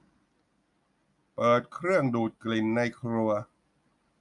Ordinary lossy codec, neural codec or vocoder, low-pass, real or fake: none; none; 10.8 kHz; real